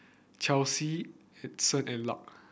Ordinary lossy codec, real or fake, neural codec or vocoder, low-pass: none; real; none; none